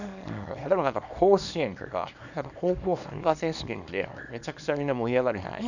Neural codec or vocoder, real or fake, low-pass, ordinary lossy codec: codec, 24 kHz, 0.9 kbps, WavTokenizer, small release; fake; 7.2 kHz; none